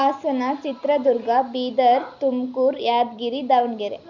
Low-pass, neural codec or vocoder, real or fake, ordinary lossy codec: 7.2 kHz; none; real; none